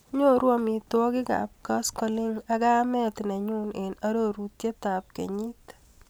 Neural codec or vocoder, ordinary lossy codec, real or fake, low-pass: none; none; real; none